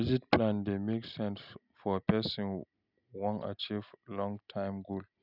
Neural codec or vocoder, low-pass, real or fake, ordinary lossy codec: none; 5.4 kHz; real; none